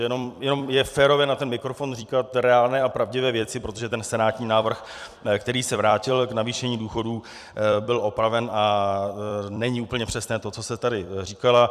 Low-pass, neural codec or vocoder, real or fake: 14.4 kHz; vocoder, 44.1 kHz, 128 mel bands every 256 samples, BigVGAN v2; fake